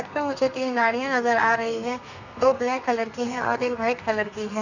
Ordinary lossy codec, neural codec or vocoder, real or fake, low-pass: none; codec, 32 kHz, 1.9 kbps, SNAC; fake; 7.2 kHz